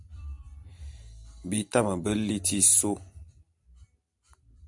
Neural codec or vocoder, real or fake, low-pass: vocoder, 44.1 kHz, 128 mel bands every 256 samples, BigVGAN v2; fake; 10.8 kHz